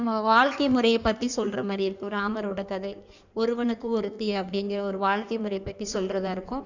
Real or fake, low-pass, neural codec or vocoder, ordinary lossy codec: fake; 7.2 kHz; codec, 16 kHz in and 24 kHz out, 1.1 kbps, FireRedTTS-2 codec; none